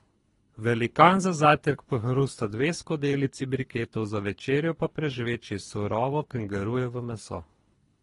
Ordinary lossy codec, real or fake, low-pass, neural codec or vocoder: AAC, 32 kbps; fake; 10.8 kHz; codec, 24 kHz, 3 kbps, HILCodec